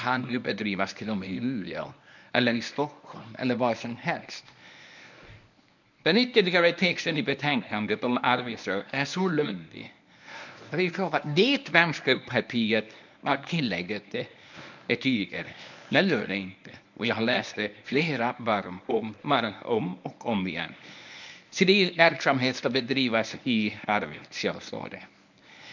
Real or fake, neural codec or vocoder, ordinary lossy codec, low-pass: fake; codec, 24 kHz, 0.9 kbps, WavTokenizer, medium speech release version 1; none; 7.2 kHz